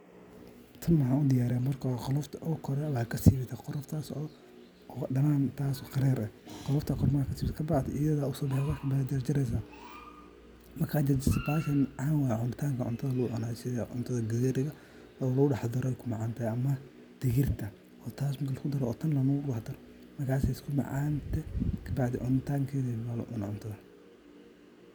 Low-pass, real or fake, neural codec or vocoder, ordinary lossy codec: none; real; none; none